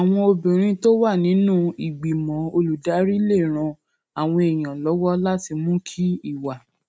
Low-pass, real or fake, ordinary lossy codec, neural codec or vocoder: none; real; none; none